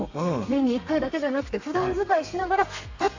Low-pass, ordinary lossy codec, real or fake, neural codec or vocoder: 7.2 kHz; AAC, 48 kbps; fake; codec, 32 kHz, 1.9 kbps, SNAC